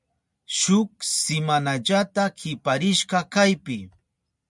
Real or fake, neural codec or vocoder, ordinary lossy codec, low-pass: real; none; MP3, 96 kbps; 10.8 kHz